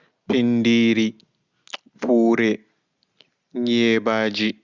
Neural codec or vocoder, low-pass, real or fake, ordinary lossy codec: none; 7.2 kHz; real; Opus, 64 kbps